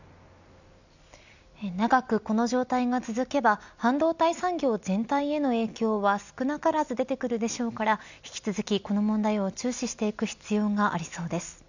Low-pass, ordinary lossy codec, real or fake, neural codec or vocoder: 7.2 kHz; none; real; none